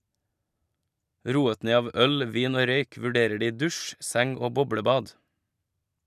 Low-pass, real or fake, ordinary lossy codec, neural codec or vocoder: 14.4 kHz; fake; none; vocoder, 44.1 kHz, 128 mel bands every 256 samples, BigVGAN v2